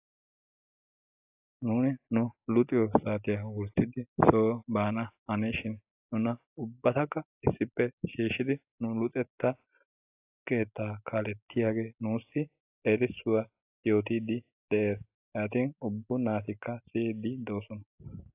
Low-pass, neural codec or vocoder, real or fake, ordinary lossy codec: 3.6 kHz; none; real; AAC, 32 kbps